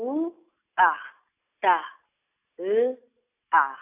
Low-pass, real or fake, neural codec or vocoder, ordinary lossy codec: 3.6 kHz; real; none; AAC, 24 kbps